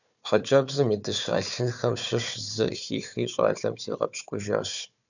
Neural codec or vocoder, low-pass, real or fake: codec, 16 kHz, 4 kbps, FunCodec, trained on Chinese and English, 50 frames a second; 7.2 kHz; fake